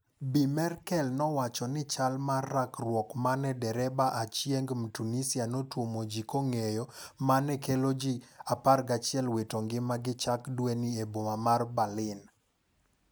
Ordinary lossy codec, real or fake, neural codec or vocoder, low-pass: none; real; none; none